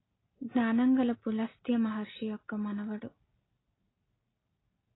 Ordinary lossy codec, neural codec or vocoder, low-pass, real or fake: AAC, 16 kbps; none; 7.2 kHz; real